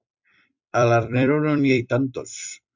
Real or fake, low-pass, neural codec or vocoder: fake; 7.2 kHz; vocoder, 44.1 kHz, 128 mel bands every 256 samples, BigVGAN v2